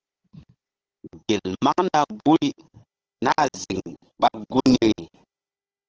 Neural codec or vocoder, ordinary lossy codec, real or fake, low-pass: codec, 16 kHz, 16 kbps, FunCodec, trained on Chinese and English, 50 frames a second; Opus, 16 kbps; fake; 7.2 kHz